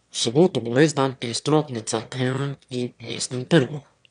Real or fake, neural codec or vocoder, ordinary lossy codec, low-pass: fake; autoencoder, 22.05 kHz, a latent of 192 numbers a frame, VITS, trained on one speaker; none; 9.9 kHz